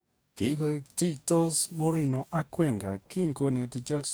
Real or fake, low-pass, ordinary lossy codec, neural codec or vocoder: fake; none; none; codec, 44.1 kHz, 2.6 kbps, DAC